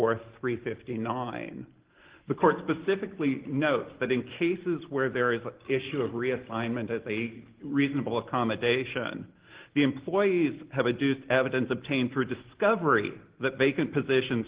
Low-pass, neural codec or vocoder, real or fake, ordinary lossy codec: 3.6 kHz; none; real; Opus, 16 kbps